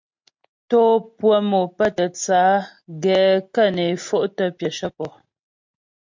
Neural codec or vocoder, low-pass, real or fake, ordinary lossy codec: none; 7.2 kHz; real; MP3, 48 kbps